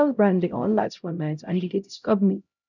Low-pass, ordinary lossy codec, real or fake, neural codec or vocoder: 7.2 kHz; none; fake; codec, 16 kHz, 0.5 kbps, X-Codec, HuBERT features, trained on LibriSpeech